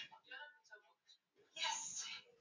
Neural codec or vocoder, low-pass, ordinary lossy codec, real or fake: none; 7.2 kHz; MP3, 64 kbps; real